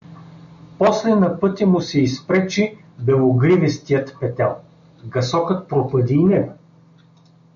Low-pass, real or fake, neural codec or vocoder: 7.2 kHz; real; none